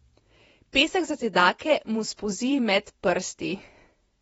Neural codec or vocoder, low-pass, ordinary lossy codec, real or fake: vocoder, 48 kHz, 128 mel bands, Vocos; 19.8 kHz; AAC, 24 kbps; fake